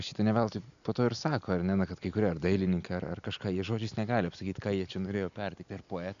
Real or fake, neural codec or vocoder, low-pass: real; none; 7.2 kHz